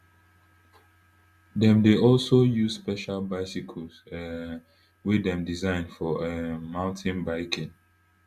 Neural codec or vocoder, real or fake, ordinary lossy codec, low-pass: none; real; Opus, 64 kbps; 14.4 kHz